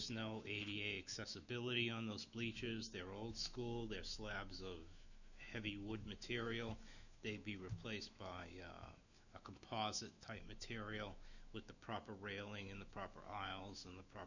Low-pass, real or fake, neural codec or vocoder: 7.2 kHz; fake; autoencoder, 48 kHz, 128 numbers a frame, DAC-VAE, trained on Japanese speech